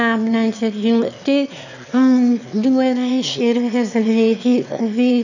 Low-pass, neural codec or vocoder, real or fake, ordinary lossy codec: 7.2 kHz; autoencoder, 22.05 kHz, a latent of 192 numbers a frame, VITS, trained on one speaker; fake; none